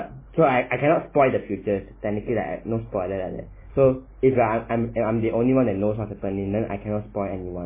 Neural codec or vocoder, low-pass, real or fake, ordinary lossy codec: none; 3.6 kHz; real; MP3, 16 kbps